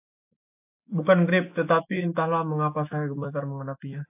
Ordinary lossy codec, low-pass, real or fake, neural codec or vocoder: AAC, 32 kbps; 3.6 kHz; fake; autoencoder, 48 kHz, 128 numbers a frame, DAC-VAE, trained on Japanese speech